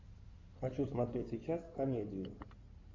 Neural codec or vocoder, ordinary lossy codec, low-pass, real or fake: codec, 16 kHz in and 24 kHz out, 2.2 kbps, FireRedTTS-2 codec; MP3, 48 kbps; 7.2 kHz; fake